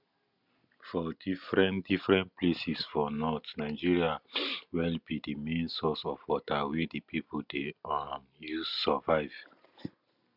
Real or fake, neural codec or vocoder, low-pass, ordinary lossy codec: real; none; 5.4 kHz; none